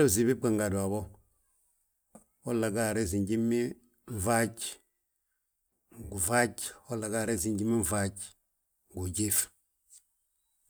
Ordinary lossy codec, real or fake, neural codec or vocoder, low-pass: none; real; none; none